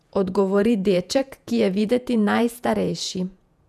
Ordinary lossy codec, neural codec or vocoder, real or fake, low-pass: none; vocoder, 48 kHz, 128 mel bands, Vocos; fake; 14.4 kHz